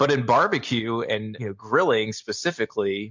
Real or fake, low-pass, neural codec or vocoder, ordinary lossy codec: real; 7.2 kHz; none; MP3, 64 kbps